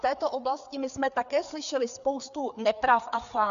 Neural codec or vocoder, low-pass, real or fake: codec, 16 kHz, 4 kbps, FreqCodec, larger model; 7.2 kHz; fake